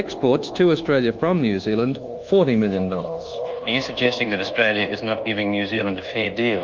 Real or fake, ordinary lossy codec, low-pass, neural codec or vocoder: fake; Opus, 24 kbps; 7.2 kHz; codec, 24 kHz, 1.2 kbps, DualCodec